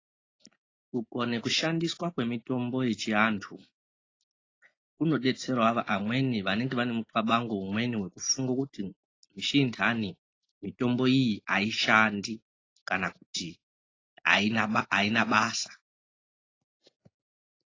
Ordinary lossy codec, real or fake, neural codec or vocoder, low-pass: AAC, 32 kbps; real; none; 7.2 kHz